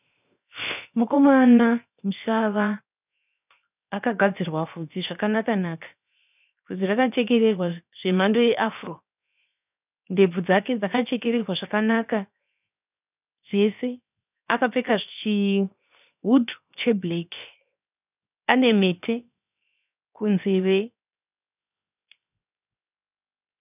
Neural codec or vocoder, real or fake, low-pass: codec, 16 kHz, 0.7 kbps, FocalCodec; fake; 3.6 kHz